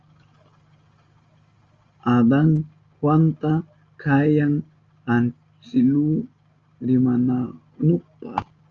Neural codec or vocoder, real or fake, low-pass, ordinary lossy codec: none; real; 7.2 kHz; Opus, 32 kbps